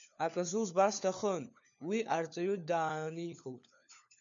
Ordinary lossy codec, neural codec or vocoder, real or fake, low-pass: MP3, 96 kbps; codec, 16 kHz, 4 kbps, FunCodec, trained on LibriTTS, 50 frames a second; fake; 7.2 kHz